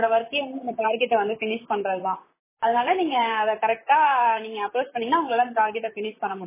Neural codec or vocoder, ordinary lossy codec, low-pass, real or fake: vocoder, 44.1 kHz, 128 mel bands, Pupu-Vocoder; MP3, 16 kbps; 3.6 kHz; fake